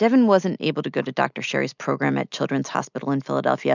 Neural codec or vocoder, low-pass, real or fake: none; 7.2 kHz; real